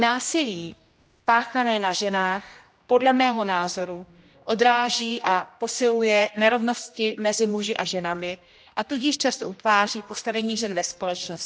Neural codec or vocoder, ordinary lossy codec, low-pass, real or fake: codec, 16 kHz, 1 kbps, X-Codec, HuBERT features, trained on general audio; none; none; fake